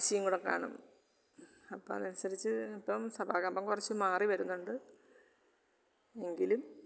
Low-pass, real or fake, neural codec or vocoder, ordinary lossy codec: none; real; none; none